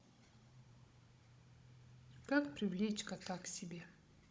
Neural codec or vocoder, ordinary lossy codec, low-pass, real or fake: codec, 16 kHz, 16 kbps, FunCodec, trained on Chinese and English, 50 frames a second; none; none; fake